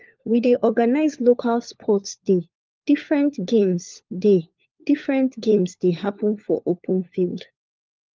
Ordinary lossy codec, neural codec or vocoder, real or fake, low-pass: Opus, 24 kbps; codec, 16 kHz, 4 kbps, FunCodec, trained on LibriTTS, 50 frames a second; fake; 7.2 kHz